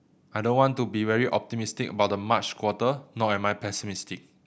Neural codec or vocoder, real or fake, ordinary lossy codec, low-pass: none; real; none; none